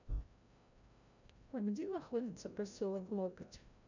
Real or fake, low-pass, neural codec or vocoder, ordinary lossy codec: fake; 7.2 kHz; codec, 16 kHz, 0.5 kbps, FreqCodec, larger model; none